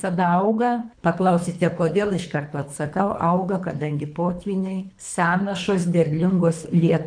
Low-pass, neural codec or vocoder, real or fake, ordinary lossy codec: 9.9 kHz; codec, 24 kHz, 3 kbps, HILCodec; fake; MP3, 64 kbps